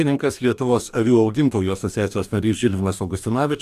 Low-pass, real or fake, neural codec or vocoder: 14.4 kHz; fake; codec, 44.1 kHz, 2.6 kbps, DAC